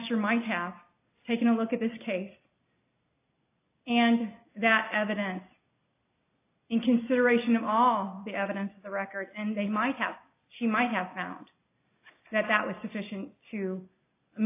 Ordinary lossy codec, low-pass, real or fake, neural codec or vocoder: AAC, 24 kbps; 3.6 kHz; real; none